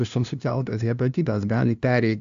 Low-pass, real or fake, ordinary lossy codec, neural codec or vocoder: 7.2 kHz; fake; AAC, 96 kbps; codec, 16 kHz, 0.5 kbps, FunCodec, trained on LibriTTS, 25 frames a second